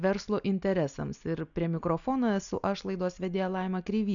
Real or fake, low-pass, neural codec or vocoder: real; 7.2 kHz; none